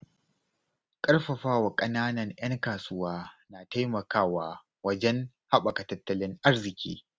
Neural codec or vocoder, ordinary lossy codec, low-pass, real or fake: none; none; none; real